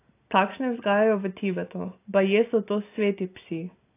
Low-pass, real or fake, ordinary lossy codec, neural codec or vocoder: 3.6 kHz; real; AAC, 24 kbps; none